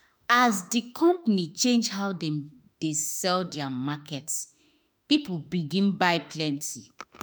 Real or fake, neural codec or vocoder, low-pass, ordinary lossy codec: fake; autoencoder, 48 kHz, 32 numbers a frame, DAC-VAE, trained on Japanese speech; none; none